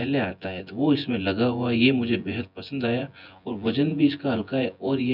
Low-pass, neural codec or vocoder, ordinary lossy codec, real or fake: 5.4 kHz; vocoder, 24 kHz, 100 mel bands, Vocos; none; fake